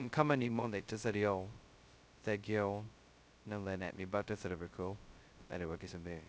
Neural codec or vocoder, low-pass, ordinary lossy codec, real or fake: codec, 16 kHz, 0.2 kbps, FocalCodec; none; none; fake